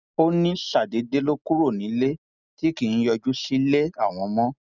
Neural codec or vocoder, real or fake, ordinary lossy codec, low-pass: none; real; none; 7.2 kHz